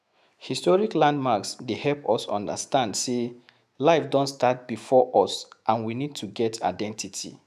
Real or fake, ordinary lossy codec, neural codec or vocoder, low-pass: fake; none; autoencoder, 48 kHz, 128 numbers a frame, DAC-VAE, trained on Japanese speech; 14.4 kHz